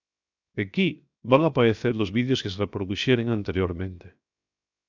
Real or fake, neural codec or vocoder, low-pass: fake; codec, 16 kHz, 0.7 kbps, FocalCodec; 7.2 kHz